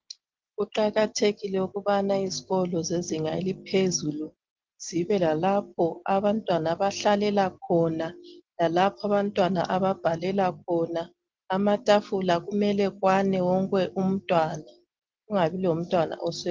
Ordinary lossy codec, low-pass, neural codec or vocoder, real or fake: Opus, 16 kbps; 7.2 kHz; none; real